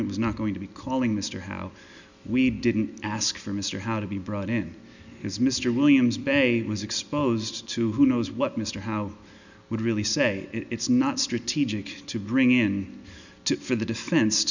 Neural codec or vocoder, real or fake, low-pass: none; real; 7.2 kHz